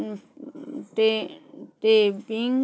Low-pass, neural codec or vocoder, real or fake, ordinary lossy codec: none; none; real; none